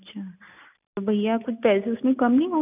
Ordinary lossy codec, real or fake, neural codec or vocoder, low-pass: none; real; none; 3.6 kHz